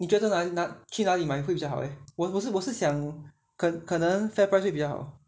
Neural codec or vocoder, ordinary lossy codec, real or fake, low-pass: none; none; real; none